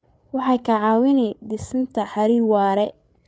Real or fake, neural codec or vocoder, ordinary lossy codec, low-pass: fake; codec, 16 kHz, 8 kbps, FreqCodec, larger model; none; none